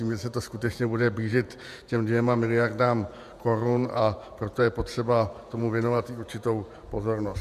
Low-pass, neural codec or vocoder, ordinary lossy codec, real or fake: 14.4 kHz; vocoder, 48 kHz, 128 mel bands, Vocos; MP3, 96 kbps; fake